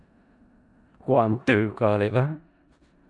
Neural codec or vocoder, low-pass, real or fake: codec, 16 kHz in and 24 kHz out, 0.4 kbps, LongCat-Audio-Codec, four codebook decoder; 10.8 kHz; fake